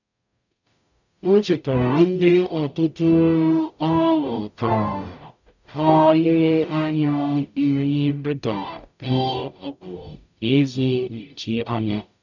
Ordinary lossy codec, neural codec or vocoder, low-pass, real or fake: none; codec, 44.1 kHz, 0.9 kbps, DAC; 7.2 kHz; fake